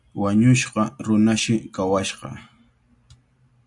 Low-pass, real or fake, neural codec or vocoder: 10.8 kHz; real; none